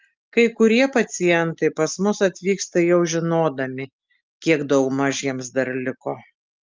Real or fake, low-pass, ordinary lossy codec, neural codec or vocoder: real; 7.2 kHz; Opus, 24 kbps; none